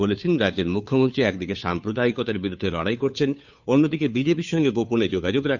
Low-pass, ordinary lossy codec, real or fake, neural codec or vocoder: 7.2 kHz; none; fake; codec, 16 kHz, 2 kbps, FunCodec, trained on Chinese and English, 25 frames a second